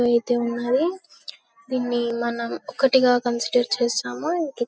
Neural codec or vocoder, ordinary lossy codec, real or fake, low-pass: none; none; real; none